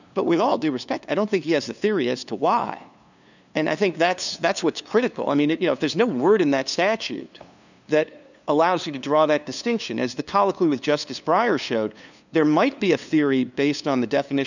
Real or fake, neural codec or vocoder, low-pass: fake; codec, 16 kHz, 2 kbps, FunCodec, trained on LibriTTS, 25 frames a second; 7.2 kHz